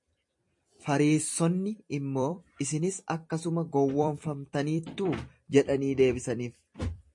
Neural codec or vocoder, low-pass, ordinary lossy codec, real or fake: vocoder, 44.1 kHz, 128 mel bands every 256 samples, BigVGAN v2; 10.8 kHz; MP3, 48 kbps; fake